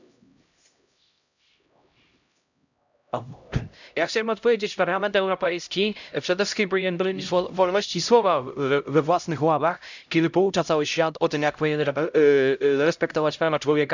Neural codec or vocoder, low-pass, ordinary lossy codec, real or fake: codec, 16 kHz, 0.5 kbps, X-Codec, HuBERT features, trained on LibriSpeech; 7.2 kHz; none; fake